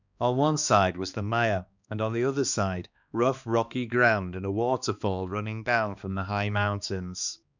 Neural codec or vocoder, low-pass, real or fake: codec, 16 kHz, 2 kbps, X-Codec, HuBERT features, trained on balanced general audio; 7.2 kHz; fake